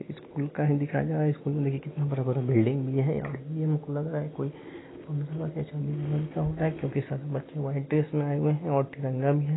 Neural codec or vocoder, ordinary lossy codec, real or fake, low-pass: none; AAC, 16 kbps; real; 7.2 kHz